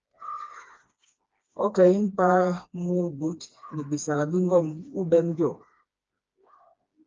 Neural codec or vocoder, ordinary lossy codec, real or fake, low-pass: codec, 16 kHz, 2 kbps, FreqCodec, smaller model; Opus, 24 kbps; fake; 7.2 kHz